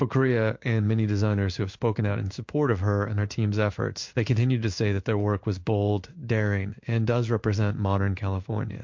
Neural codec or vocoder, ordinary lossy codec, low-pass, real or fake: codec, 16 kHz in and 24 kHz out, 1 kbps, XY-Tokenizer; MP3, 48 kbps; 7.2 kHz; fake